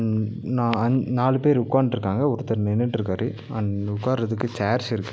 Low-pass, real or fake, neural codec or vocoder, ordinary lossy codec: none; real; none; none